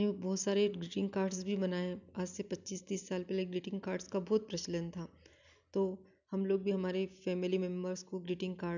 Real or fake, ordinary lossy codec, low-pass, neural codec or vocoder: real; none; 7.2 kHz; none